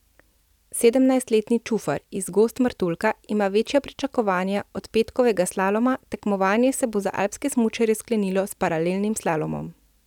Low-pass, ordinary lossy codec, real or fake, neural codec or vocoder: 19.8 kHz; none; real; none